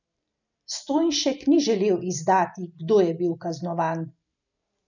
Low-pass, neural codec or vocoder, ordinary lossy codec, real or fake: 7.2 kHz; none; none; real